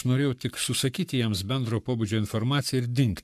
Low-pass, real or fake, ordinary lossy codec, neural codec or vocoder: 14.4 kHz; fake; MP3, 96 kbps; codec, 44.1 kHz, 7.8 kbps, Pupu-Codec